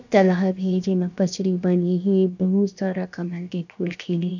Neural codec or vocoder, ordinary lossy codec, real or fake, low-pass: codec, 16 kHz, 0.7 kbps, FocalCodec; none; fake; 7.2 kHz